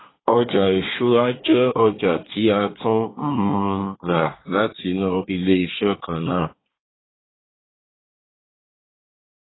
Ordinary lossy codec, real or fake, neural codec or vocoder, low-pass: AAC, 16 kbps; fake; codec, 24 kHz, 1 kbps, SNAC; 7.2 kHz